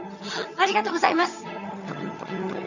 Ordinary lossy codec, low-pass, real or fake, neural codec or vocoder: none; 7.2 kHz; fake; vocoder, 22.05 kHz, 80 mel bands, HiFi-GAN